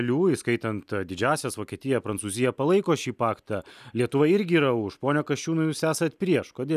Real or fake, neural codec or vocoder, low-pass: real; none; 14.4 kHz